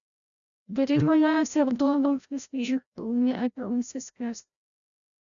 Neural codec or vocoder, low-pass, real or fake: codec, 16 kHz, 0.5 kbps, FreqCodec, larger model; 7.2 kHz; fake